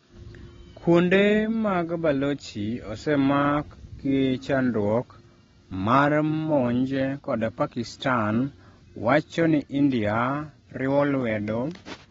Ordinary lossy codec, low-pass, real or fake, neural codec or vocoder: AAC, 24 kbps; 7.2 kHz; real; none